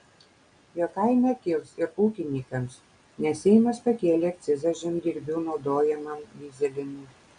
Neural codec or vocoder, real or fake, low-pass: none; real; 9.9 kHz